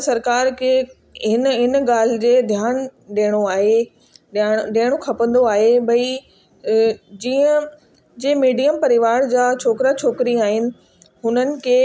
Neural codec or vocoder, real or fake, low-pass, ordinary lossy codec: none; real; none; none